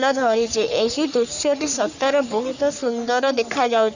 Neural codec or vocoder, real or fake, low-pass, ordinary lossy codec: codec, 44.1 kHz, 3.4 kbps, Pupu-Codec; fake; 7.2 kHz; none